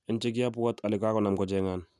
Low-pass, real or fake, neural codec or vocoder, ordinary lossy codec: none; real; none; none